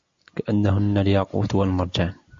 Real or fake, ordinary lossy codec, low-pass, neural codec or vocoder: real; MP3, 48 kbps; 7.2 kHz; none